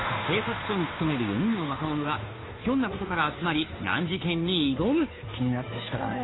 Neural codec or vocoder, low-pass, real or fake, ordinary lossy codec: codec, 16 kHz, 2 kbps, FunCodec, trained on Chinese and English, 25 frames a second; 7.2 kHz; fake; AAC, 16 kbps